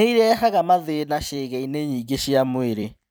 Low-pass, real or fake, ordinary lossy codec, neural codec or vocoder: none; fake; none; vocoder, 44.1 kHz, 128 mel bands every 256 samples, BigVGAN v2